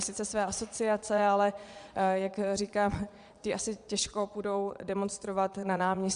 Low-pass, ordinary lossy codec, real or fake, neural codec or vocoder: 9.9 kHz; Opus, 64 kbps; fake; vocoder, 22.05 kHz, 80 mel bands, Vocos